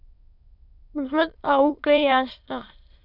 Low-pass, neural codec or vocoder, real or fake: 5.4 kHz; autoencoder, 22.05 kHz, a latent of 192 numbers a frame, VITS, trained on many speakers; fake